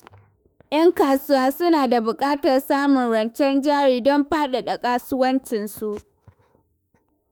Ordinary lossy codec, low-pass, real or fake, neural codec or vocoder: none; none; fake; autoencoder, 48 kHz, 32 numbers a frame, DAC-VAE, trained on Japanese speech